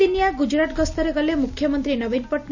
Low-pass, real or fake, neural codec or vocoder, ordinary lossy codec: 7.2 kHz; real; none; none